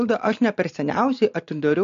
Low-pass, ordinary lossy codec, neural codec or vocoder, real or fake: 7.2 kHz; MP3, 48 kbps; none; real